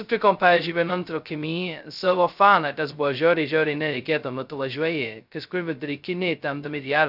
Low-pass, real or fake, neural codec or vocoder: 5.4 kHz; fake; codec, 16 kHz, 0.2 kbps, FocalCodec